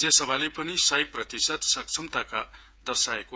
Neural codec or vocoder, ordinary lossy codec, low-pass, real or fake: codec, 16 kHz, 8 kbps, FreqCodec, smaller model; none; none; fake